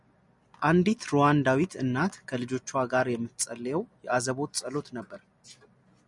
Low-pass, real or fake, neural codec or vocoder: 10.8 kHz; real; none